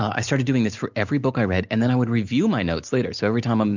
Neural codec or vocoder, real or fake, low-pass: none; real; 7.2 kHz